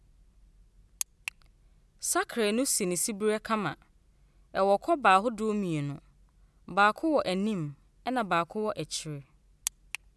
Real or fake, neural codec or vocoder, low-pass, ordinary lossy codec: real; none; none; none